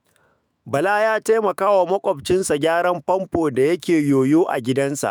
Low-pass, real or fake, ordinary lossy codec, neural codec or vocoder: none; fake; none; autoencoder, 48 kHz, 128 numbers a frame, DAC-VAE, trained on Japanese speech